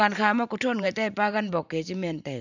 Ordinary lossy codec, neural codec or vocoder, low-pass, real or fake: none; vocoder, 44.1 kHz, 128 mel bands every 512 samples, BigVGAN v2; 7.2 kHz; fake